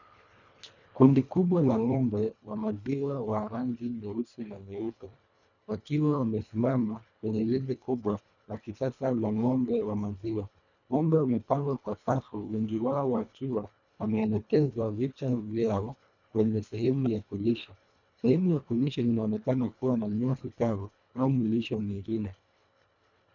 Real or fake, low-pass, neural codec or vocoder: fake; 7.2 kHz; codec, 24 kHz, 1.5 kbps, HILCodec